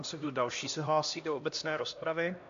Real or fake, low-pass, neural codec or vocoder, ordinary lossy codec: fake; 7.2 kHz; codec, 16 kHz, 1 kbps, X-Codec, HuBERT features, trained on LibriSpeech; AAC, 64 kbps